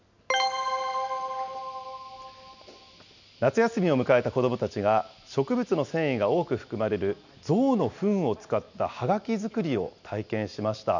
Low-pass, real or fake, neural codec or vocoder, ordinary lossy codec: 7.2 kHz; real; none; none